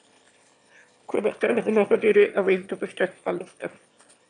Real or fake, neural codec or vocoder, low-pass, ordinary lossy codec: fake; autoencoder, 22.05 kHz, a latent of 192 numbers a frame, VITS, trained on one speaker; 9.9 kHz; MP3, 96 kbps